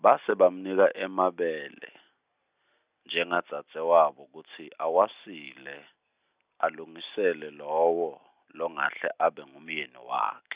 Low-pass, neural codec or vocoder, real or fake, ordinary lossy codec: 3.6 kHz; none; real; Opus, 64 kbps